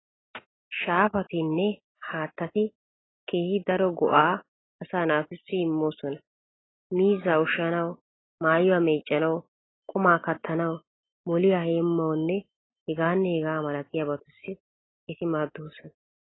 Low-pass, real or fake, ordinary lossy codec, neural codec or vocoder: 7.2 kHz; real; AAC, 16 kbps; none